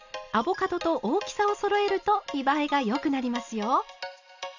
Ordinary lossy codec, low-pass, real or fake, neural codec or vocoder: none; 7.2 kHz; real; none